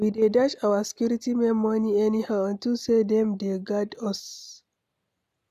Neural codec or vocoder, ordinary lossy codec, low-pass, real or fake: none; none; 14.4 kHz; real